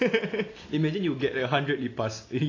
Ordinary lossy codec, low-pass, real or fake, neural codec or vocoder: AAC, 32 kbps; 7.2 kHz; real; none